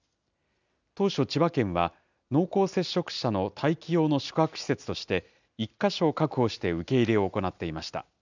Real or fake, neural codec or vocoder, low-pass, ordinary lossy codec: real; none; 7.2 kHz; none